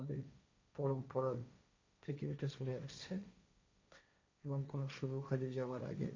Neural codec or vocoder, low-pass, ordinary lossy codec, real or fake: codec, 16 kHz, 1.1 kbps, Voila-Tokenizer; 7.2 kHz; MP3, 64 kbps; fake